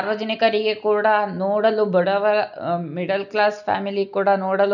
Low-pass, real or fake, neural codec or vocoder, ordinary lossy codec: 7.2 kHz; real; none; none